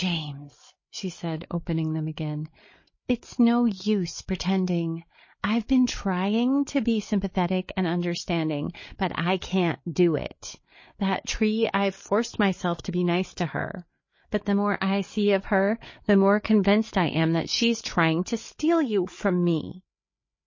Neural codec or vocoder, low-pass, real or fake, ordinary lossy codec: codec, 16 kHz, 8 kbps, FreqCodec, larger model; 7.2 kHz; fake; MP3, 32 kbps